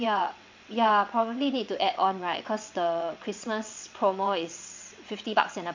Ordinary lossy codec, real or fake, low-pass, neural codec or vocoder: MP3, 64 kbps; fake; 7.2 kHz; vocoder, 22.05 kHz, 80 mel bands, WaveNeXt